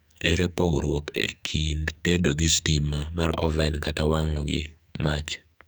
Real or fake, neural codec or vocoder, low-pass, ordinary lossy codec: fake; codec, 44.1 kHz, 2.6 kbps, SNAC; none; none